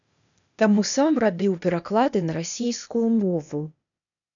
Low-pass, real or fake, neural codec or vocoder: 7.2 kHz; fake; codec, 16 kHz, 0.8 kbps, ZipCodec